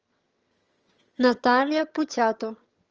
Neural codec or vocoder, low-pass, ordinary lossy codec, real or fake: vocoder, 22.05 kHz, 80 mel bands, HiFi-GAN; 7.2 kHz; Opus, 24 kbps; fake